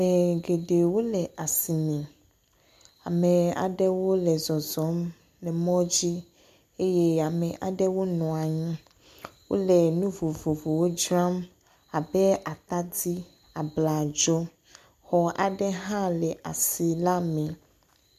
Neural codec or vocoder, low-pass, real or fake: none; 14.4 kHz; real